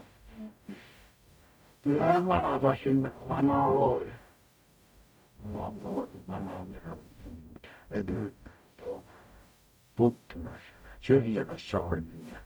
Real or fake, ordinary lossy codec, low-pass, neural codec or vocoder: fake; none; none; codec, 44.1 kHz, 0.9 kbps, DAC